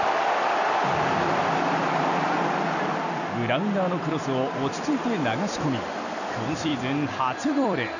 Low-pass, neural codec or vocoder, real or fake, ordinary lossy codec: 7.2 kHz; vocoder, 44.1 kHz, 128 mel bands every 256 samples, BigVGAN v2; fake; none